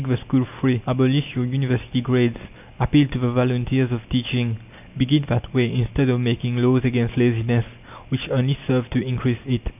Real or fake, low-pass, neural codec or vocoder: real; 3.6 kHz; none